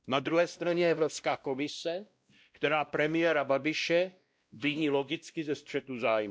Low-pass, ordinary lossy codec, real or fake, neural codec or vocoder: none; none; fake; codec, 16 kHz, 1 kbps, X-Codec, WavLM features, trained on Multilingual LibriSpeech